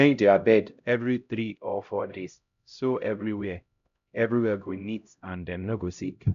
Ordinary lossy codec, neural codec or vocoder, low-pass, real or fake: none; codec, 16 kHz, 0.5 kbps, X-Codec, HuBERT features, trained on LibriSpeech; 7.2 kHz; fake